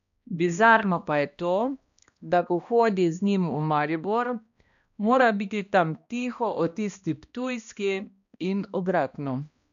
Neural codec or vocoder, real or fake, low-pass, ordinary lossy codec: codec, 16 kHz, 1 kbps, X-Codec, HuBERT features, trained on balanced general audio; fake; 7.2 kHz; AAC, 96 kbps